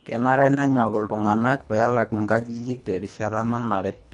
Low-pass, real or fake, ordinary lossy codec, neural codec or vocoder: 10.8 kHz; fake; none; codec, 24 kHz, 1.5 kbps, HILCodec